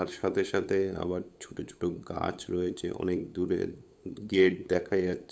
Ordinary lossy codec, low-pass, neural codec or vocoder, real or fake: none; none; codec, 16 kHz, 8 kbps, FunCodec, trained on LibriTTS, 25 frames a second; fake